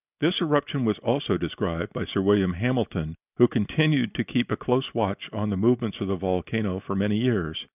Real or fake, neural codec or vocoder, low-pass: real; none; 3.6 kHz